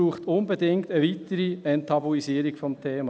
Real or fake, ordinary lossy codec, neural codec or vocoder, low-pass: real; none; none; none